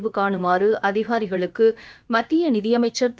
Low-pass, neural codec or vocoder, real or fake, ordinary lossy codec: none; codec, 16 kHz, about 1 kbps, DyCAST, with the encoder's durations; fake; none